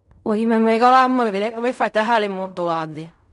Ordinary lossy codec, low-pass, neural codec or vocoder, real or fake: none; 10.8 kHz; codec, 16 kHz in and 24 kHz out, 0.4 kbps, LongCat-Audio-Codec, fine tuned four codebook decoder; fake